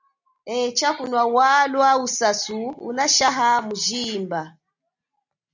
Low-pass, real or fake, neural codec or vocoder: 7.2 kHz; real; none